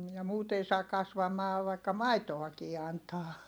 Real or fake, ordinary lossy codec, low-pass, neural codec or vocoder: fake; none; none; vocoder, 44.1 kHz, 128 mel bands every 256 samples, BigVGAN v2